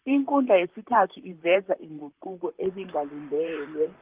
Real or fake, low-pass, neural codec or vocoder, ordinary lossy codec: fake; 3.6 kHz; vocoder, 44.1 kHz, 128 mel bands, Pupu-Vocoder; Opus, 24 kbps